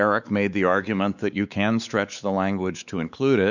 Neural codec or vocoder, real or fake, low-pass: codec, 16 kHz, 4 kbps, X-Codec, WavLM features, trained on Multilingual LibriSpeech; fake; 7.2 kHz